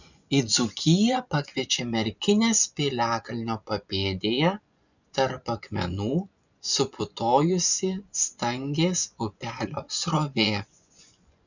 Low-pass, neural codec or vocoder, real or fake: 7.2 kHz; vocoder, 24 kHz, 100 mel bands, Vocos; fake